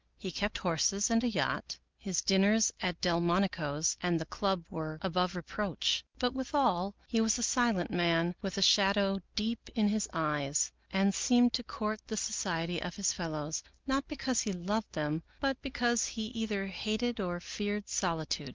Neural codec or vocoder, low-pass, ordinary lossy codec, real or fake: none; 7.2 kHz; Opus, 32 kbps; real